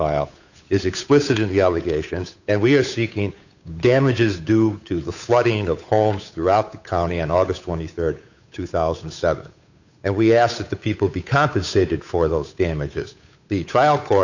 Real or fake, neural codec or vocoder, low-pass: fake; codec, 16 kHz, 8 kbps, FunCodec, trained on Chinese and English, 25 frames a second; 7.2 kHz